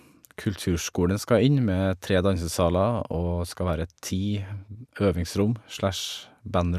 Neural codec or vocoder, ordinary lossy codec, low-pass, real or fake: none; none; 14.4 kHz; real